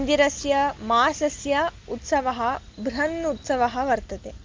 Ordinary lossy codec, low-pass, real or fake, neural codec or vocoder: Opus, 32 kbps; 7.2 kHz; real; none